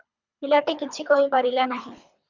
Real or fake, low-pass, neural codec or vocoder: fake; 7.2 kHz; codec, 24 kHz, 3 kbps, HILCodec